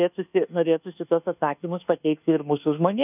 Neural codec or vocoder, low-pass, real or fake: codec, 24 kHz, 1.2 kbps, DualCodec; 3.6 kHz; fake